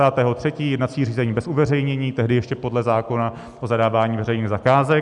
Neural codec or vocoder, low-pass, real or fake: none; 10.8 kHz; real